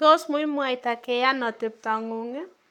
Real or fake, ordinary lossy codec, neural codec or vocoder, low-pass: fake; none; codec, 44.1 kHz, 7.8 kbps, Pupu-Codec; 19.8 kHz